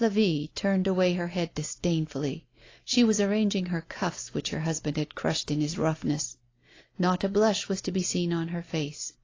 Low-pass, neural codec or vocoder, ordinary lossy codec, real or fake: 7.2 kHz; none; AAC, 32 kbps; real